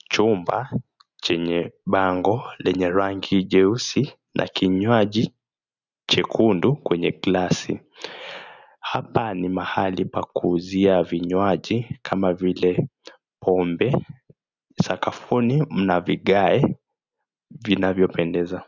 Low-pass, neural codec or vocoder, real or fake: 7.2 kHz; none; real